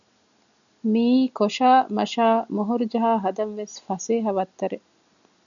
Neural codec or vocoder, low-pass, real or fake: none; 7.2 kHz; real